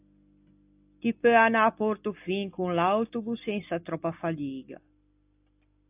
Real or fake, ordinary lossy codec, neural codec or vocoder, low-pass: real; AAC, 32 kbps; none; 3.6 kHz